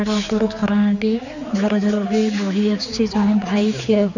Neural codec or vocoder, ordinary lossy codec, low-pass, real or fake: codec, 16 kHz, 4 kbps, X-Codec, HuBERT features, trained on general audio; none; 7.2 kHz; fake